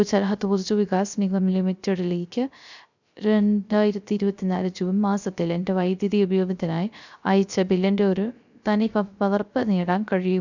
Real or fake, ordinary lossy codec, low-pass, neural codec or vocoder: fake; none; 7.2 kHz; codec, 16 kHz, 0.3 kbps, FocalCodec